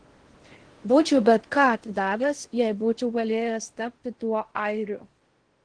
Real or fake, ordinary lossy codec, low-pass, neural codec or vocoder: fake; Opus, 16 kbps; 9.9 kHz; codec, 16 kHz in and 24 kHz out, 0.6 kbps, FocalCodec, streaming, 4096 codes